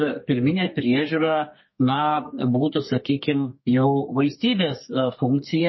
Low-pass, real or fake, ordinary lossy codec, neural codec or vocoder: 7.2 kHz; fake; MP3, 24 kbps; codec, 32 kHz, 1.9 kbps, SNAC